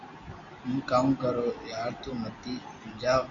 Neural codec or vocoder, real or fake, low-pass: none; real; 7.2 kHz